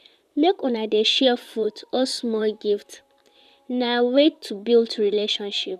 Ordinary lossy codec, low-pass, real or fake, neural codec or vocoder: none; 14.4 kHz; fake; vocoder, 44.1 kHz, 128 mel bands, Pupu-Vocoder